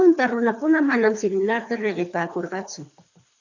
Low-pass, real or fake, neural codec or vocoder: 7.2 kHz; fake; codec, 24 kHz, 3 kbps, HILCodec